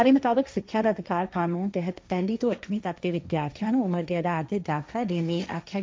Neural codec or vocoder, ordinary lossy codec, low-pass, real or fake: codec, 16 kHz, 1.1 kbps, Voila-Tokenizer; none; none; fake